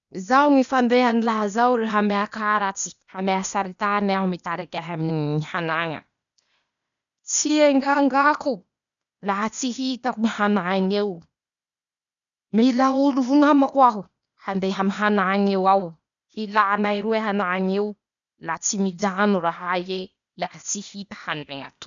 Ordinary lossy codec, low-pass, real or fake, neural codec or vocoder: none; 7.2 kHz; fake; codec, 16 kHz, 0.8 kbps, ZipCodec